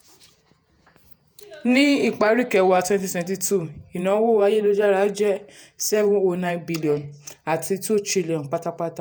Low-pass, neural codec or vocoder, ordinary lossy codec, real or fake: none; vocoder, 48 kHz, 128 mel bands, Vocos; none; fake